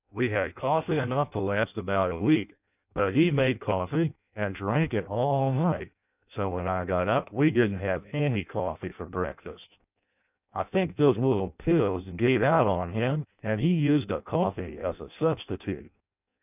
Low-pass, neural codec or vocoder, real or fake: 3.6 kHz; codec, 16 kHz in and 24 kHz out, 0.6 kbps, FireRedTTS-2 codec; fake